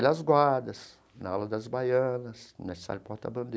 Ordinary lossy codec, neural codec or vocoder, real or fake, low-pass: none; none; real; none